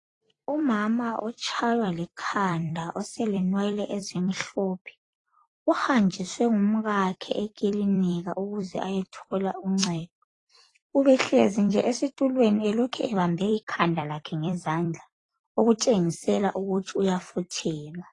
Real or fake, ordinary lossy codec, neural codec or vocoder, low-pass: fake; AAC, 32 kbps; vocoder, 44.1 kHz, 128 mel bands every 256 samples, BigVGAN v2; 10.8 kHz